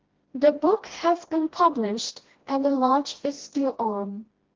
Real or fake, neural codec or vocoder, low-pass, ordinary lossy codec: fake; codec, 16 kHz, 1 kbps, FreqCodec, smaller model; 7.2 kHz; Opus, 16 kbps